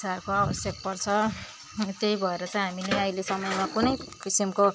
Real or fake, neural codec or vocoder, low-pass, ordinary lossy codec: real; none; none; none